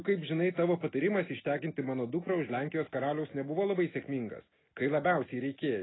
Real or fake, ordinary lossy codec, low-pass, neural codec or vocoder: real; AAC, 16 kbps; 7.2 kHz; none